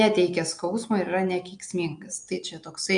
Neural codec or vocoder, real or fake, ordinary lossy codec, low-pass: none; real; MP3, 64 kbps; 9.9 kHz